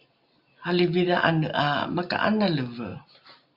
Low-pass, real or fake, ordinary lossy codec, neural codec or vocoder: 5.4 kHz; real; Opus, 64 kbps; none